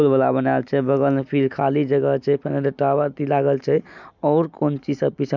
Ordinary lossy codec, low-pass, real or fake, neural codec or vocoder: none; 7.2 kHz; real; none